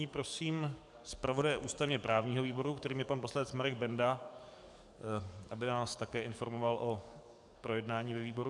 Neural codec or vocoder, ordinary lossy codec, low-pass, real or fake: codec, 44.1 kHz, 7.8 kbps, DAC; MP3, 96 kbps; 10.8 kHz; fake